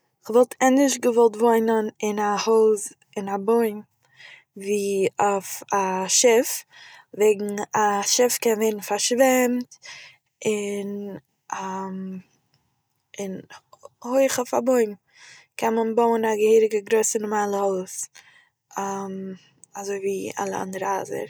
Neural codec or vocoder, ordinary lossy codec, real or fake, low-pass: none; none; real; none